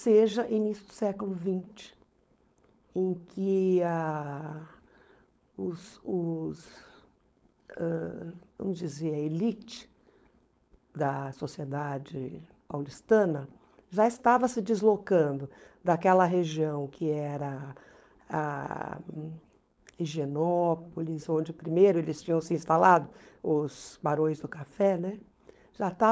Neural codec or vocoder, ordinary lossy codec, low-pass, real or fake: codec, 16 kHz, 4.8 kbps, FACodec; none; none; fake